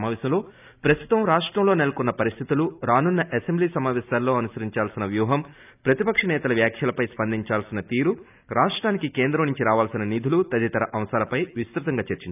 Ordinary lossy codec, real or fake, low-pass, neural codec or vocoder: none; real; 3.6 kHz; none